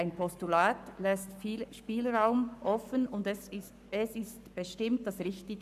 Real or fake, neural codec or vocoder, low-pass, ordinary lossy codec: fake; codec, 44.1 kHz, 7.8 kbps, Pupu-Codec; 14.4 kHz; none